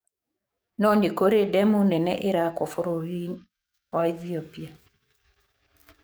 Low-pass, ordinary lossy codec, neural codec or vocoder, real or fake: none; none; codec, 44.1 kHz, 7.8 kbps, DAC; fake